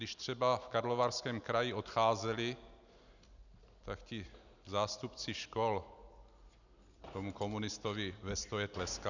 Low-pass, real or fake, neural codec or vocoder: 7.2 kHz; real; none